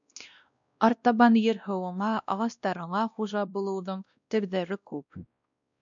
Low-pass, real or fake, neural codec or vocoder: 7.2 kHz; fake; codec, 16 kHz, 1 kbps, X-Codec, WavLM features, trained on Multilingual LibriSpeech